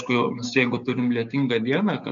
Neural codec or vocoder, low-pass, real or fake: codec, 16 kHz, 6 kbps, DAC; 7.2 kHz; fake